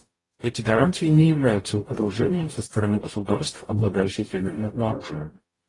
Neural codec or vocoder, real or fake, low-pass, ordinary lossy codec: codec, 44.1 kHz, 0.9 kbps, DAC; fake; 10.8 kHz; AAC, 32 kbps